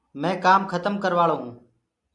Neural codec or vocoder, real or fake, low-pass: none; real; 10.8 kHz